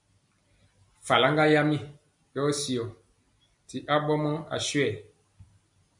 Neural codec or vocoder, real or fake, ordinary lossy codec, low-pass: none; real; MP3, 96 kbps; 10.8 kHz